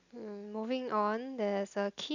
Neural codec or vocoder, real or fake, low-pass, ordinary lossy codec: none; real; 7.2 kHz; none